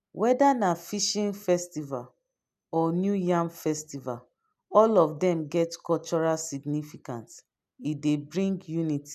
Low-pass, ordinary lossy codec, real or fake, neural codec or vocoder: 14.4 kHz; none; real; none